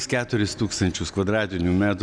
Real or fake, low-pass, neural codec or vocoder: real; 9.9 kHz; none